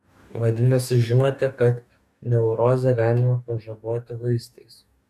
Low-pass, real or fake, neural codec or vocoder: 14.4 kHz; fake; codec, 44.1 kHz, 2.6 kbps, DAC